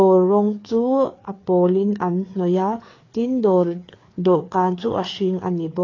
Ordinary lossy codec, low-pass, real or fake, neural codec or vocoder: AAC, 32 kbps; 7.2 kHz; fake; codec, 16 kHz, 4 kbps, FreqCodec, larger model